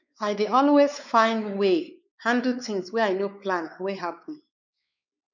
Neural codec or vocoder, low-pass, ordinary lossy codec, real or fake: codec, 16 kHz, 4 kbps, X-Codec, WavLM features, trained on Multilingual LibriSpeech; 7.2 kHz; none; fake